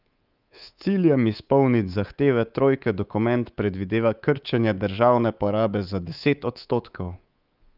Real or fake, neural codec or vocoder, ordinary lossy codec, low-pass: fake; codec, 24 kHz, 3.1 kbps, DualCodec; Opus, 32 kbps; 5.4 kHz